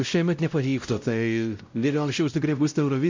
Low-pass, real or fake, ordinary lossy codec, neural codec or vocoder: 7.2 kHz; fake; MP3, 64 kbps; codec, 16 kHz, 0.5 kbps, X-Codec, WavLM features, trained on Multilingual LibriSpeech